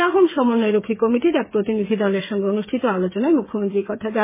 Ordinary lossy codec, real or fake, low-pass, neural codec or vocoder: MP3, 16 kbps; fake; 3.6 kHz; codec, 16 kHz, 8 kbps, FreqCodec, smaller model